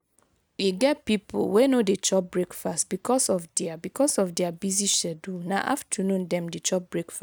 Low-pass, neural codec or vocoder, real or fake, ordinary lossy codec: none; none; real; none